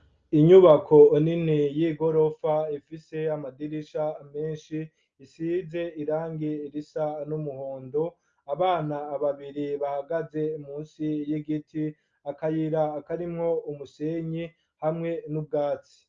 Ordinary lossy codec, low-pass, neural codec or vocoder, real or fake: Opus, 24 kbps; 7.2 kHz; none; real